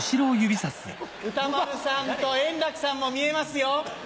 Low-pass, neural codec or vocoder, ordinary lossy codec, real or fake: none; none; none; real